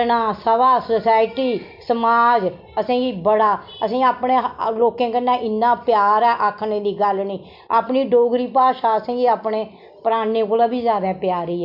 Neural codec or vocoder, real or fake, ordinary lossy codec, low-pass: none; real; MP3, 48 kbps; 5.4 kHz